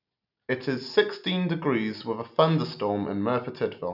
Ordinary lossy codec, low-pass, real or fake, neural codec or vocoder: none; 5.4 kHz; real; none